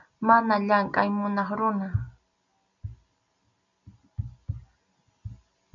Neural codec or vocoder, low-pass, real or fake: none; 7.2 kHz; real